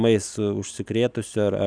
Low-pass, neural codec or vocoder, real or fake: 9.9 kHz; none; real